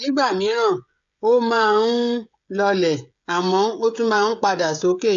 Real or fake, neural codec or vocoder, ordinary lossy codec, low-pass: fake; codec, 16 kHz, 16 kbps, FreqCodec, smaller model; AAC, 64 kbps; 7.2 kHz